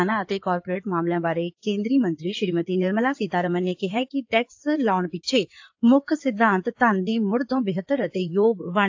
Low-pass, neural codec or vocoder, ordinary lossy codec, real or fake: 7.2 kHz; codec, 16 kHz in and 24 kHz out, 2.2 kbps, FireRedTTS-2 codec; AAC, 48 kbps; fake